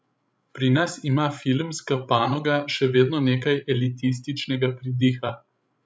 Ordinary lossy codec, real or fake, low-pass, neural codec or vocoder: none; fake; none; codec, 16 kHz, 16 kbps, FreqCodec, larger model